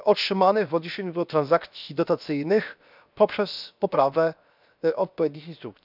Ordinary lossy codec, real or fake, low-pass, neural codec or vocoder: none; fake; 5.4 kHz; codec, 16 kHz, 0.7 kbps, FocalCodec